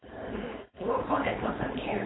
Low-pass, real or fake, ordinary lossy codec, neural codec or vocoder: 7.2 kHz; fake; AAC, 16 kbps; codec, 16 kHz, 4.8 kbps, FACodec